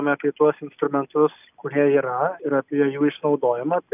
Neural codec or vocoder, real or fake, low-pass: none; real; 3.6 kHz